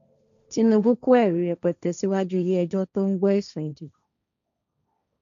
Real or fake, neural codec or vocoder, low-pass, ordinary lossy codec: fake; codec, 16 kHz, 1.1 kbps, Voila-Tokenizer; 7.2 kHz; none